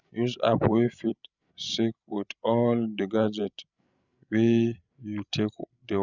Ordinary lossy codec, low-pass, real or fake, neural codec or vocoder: none; 7.2 kHz; fake; codec, 16 kHz, 16 kbps, FreqCodec, larger model